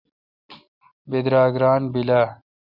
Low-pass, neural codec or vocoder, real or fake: 5.4 kHz; none; real